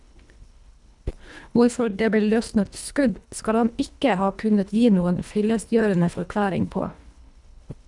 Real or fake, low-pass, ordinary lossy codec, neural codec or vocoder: fake; 10.8 kHz; none; codec, 24 kHz, 1.5 kbps, HILCodec